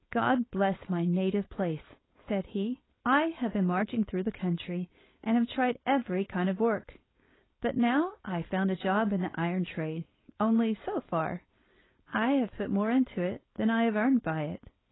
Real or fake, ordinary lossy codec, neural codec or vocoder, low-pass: fake; AAC, 16 kbps; codec, 16 kHz, 4.8 kbps, FACodec; 7.2 kHz